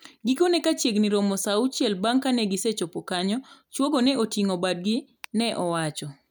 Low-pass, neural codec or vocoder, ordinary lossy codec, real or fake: none; none; none; real